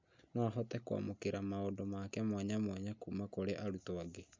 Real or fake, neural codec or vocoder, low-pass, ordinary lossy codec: real; none; 7.2 kHz; MP3, 64 kbps